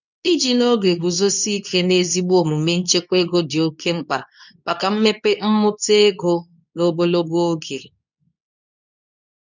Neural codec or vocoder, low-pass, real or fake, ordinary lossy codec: codec, 24 kHz, 0.9 kbps, WavTokenizer, medium speech release version 2; 7.2 kHz; fake; none